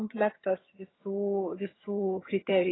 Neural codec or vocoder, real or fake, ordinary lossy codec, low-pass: vocoder, 22.05 kHz, 80 mel bands, HiFi-GAN; fake; AAC, 16 kbps; 7.2 kHz